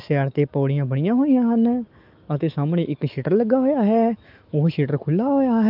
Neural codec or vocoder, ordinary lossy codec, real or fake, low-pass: autoencoder, 48 kHz, 128 numbers a frame, DAC-VAE, trained on Japanese speech; Opus, 24 kbps; fake; 5.4 kHz